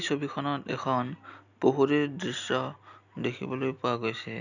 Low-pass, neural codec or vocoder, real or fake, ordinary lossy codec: 7.2 kHz; none; real; none